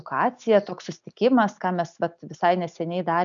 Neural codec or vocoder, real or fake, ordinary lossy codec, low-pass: none; real; MP3, 96 kbps; 7.2 kHz